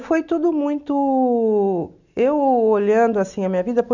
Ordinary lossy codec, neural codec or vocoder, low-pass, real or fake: none; none; 7.2 kHz; real